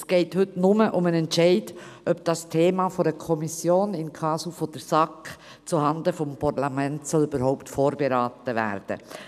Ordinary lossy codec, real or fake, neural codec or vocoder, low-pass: none; real; none; 14.4 kHz